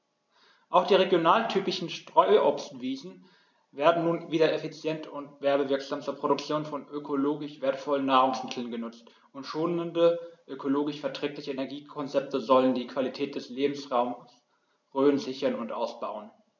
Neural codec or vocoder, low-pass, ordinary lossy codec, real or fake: none; 7.2 kHz; none; real